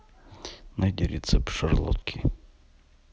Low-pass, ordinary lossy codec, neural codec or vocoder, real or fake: none; none; none; real